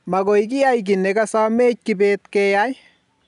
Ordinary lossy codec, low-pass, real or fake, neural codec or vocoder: none; 10.8 kHz; real; none